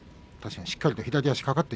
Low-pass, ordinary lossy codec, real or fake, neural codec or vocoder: none; none; real; none